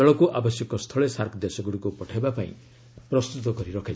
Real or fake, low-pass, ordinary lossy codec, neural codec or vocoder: real; none; none; none